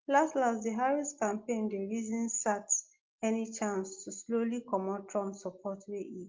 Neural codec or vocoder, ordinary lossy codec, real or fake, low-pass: none; Opus, 32 kbps; real; 7.2 kHz